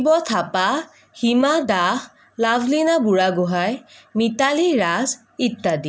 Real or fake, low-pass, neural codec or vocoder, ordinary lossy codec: real; none; none; none